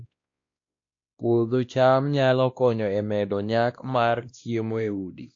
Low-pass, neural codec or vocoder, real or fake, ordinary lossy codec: 7.2 kHz; codec, 16 kHz, 1 kbps, X-Codec, WavLM features, trained on Multilingual LibriSpeech; fake; AAC, 48 kbps